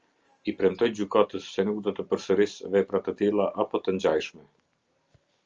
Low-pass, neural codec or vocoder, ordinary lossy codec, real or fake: 7.2 kHz; none; Opus, 24 kbps; real